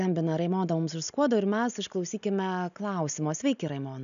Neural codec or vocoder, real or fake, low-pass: none; real; 7.2 kHz